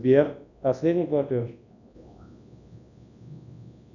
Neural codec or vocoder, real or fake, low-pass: codec, 24 kHz, 0.9 kbps, WavTokenizer, large speech release; fake; 7.2 kHz